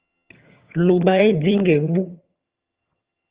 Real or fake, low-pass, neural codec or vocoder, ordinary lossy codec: fake; 3.6 kHz; vocoder, 22.05 kHz, 80 mel bands, HiFi-GAN; Opus, 32 kbps